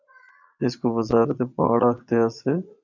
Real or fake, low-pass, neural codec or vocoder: fake; 7.2 kHz; vocoder, 22.05 kHz, 80 mel bands, Vocos